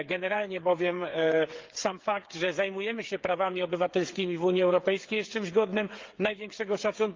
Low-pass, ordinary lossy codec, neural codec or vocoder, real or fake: 7.2 kHz; Opus, 32 kbps; codec, 16 kHz, 8 kbps, FreqCodec, smaller model; fake